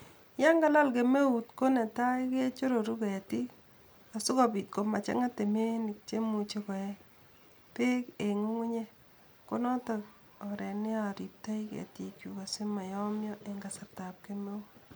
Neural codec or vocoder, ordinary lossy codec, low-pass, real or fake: none; none; none; real